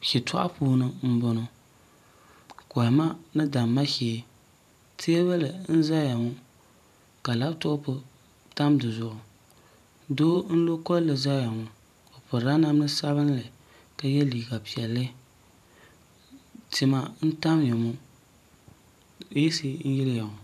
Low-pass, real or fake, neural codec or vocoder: 14.4 kHz; fake; vocoder, 44.1 kHz, 128 mel bands every 512 samples, BigVGAN v2